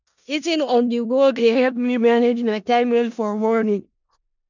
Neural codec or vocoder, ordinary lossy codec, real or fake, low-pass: codec, 16 kHz in and 24 kHz out, 0.4 kbps, LongCat-Audio-Codec, four codebook decoder; none; fake; 7.2 kHz